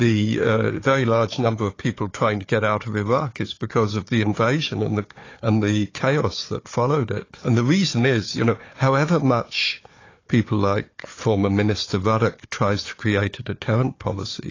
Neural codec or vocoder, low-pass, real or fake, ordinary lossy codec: codec, 16 kHz, 4 kbps, FunCodec, trained on Chinese and English, 50 frames a second; 7.2 kHz; fake; AAC, 32 kbps